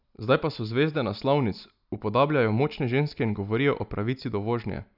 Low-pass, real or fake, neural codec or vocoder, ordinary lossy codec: 5.4 kHz; real; none; none